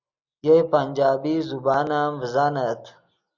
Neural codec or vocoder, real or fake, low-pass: none; real; 7.2 kHz